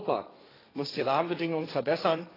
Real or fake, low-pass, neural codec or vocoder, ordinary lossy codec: fake; 5.4 kHz; codec, 16 kHz, 1.1 kbps, Voila-Tokenizer; AAC, 24 kbps